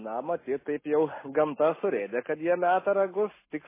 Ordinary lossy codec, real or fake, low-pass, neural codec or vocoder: MP3, 16 kbps; real; 3.6 kHz; none